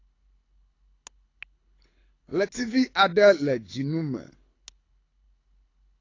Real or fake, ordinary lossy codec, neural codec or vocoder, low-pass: fake; AAC, 32 kbps; codec, 24 kHz, 6 kbps, HILCodec; 7.2 kHz